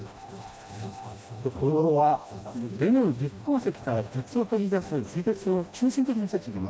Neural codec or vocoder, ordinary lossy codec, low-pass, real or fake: codec, 16 kHz, 1 kbps, FreqCodec, smaller model; none; none; fake